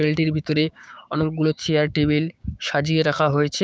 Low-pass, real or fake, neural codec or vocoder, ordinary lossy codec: none; fake; codec, 16 kHz, 6 kbps, DAC; none